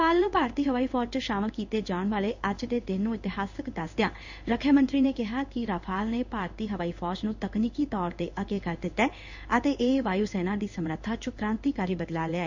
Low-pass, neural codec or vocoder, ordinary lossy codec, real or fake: 7.2 kHz; codec, 16 kHz in and 24 kHz out, 1 kbps, XY-Tokenizer; none; fake